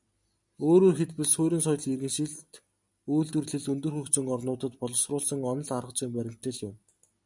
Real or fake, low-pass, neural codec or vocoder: real; 10.8 kHz; none